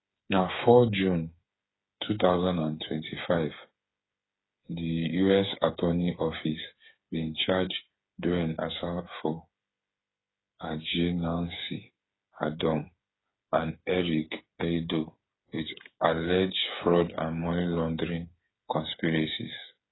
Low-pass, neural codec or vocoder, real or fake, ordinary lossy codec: 7.2 kHz; codec, 16 kHz, 8 kbps, FreqCodec, smaller model; fake; AAC, 16 kbps